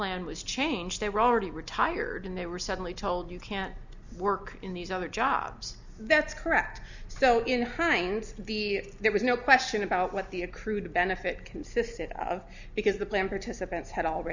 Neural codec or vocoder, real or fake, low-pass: none; real; 7.2 kHz